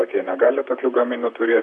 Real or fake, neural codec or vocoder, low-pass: fake; vocoder, 44.1 kHz, 128 mel bands, Pupu-Vocoder; 10.8 kHz